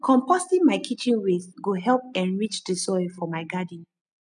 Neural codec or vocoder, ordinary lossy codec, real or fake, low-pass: none; none; real; 9.9 kHz